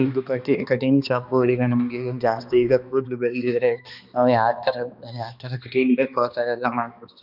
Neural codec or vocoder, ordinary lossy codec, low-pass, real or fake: codec, 16 kHz, 2 kbps, X-Codec, HuBERT features, trained on balanced general audio; none; 5.4 kHz; fake